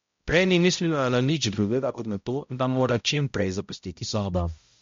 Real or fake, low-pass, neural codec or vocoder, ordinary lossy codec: fake; 7.2 kHz; codec, 16 kHz, 0.5 kbps, X-Codec, HuBERT features, trained on balanced general audio; MP3, 48 kbps